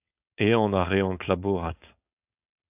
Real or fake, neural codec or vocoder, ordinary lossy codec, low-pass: fake; codec, 16 kHz, 4.8 kbps, FACodec; AAC, 32 kbps; 3.6 kHz